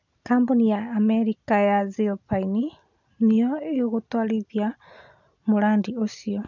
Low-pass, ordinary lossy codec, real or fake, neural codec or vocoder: 7.2 kHz; none; real; none